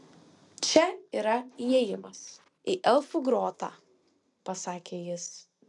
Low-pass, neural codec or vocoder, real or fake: 10.8 kHz; none; real